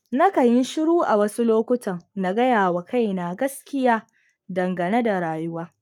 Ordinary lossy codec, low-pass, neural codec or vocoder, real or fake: none; 19.8 kHz; codec, 44.1 kHz, 7.8 kbps, Pupu-Codec; fake